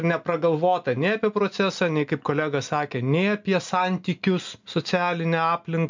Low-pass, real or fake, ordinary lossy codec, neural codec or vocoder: 7.2 kHz; real; MP3, 64 kbps; none